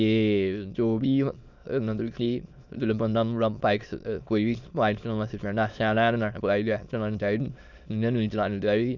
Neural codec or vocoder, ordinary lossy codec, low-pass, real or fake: autoencoder, 22.05 kHz, a latent of 192 numbers a frame, VITS, trained on many speakers; none; 7.2 kHz; fake